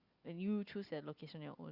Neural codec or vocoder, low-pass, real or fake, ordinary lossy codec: none; 5.4 kHz; real; Opus, 64 kbps